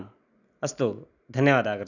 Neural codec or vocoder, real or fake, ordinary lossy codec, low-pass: none; real; none; 7.2 kHz